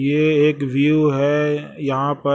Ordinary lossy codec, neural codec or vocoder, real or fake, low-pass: none; none; real; none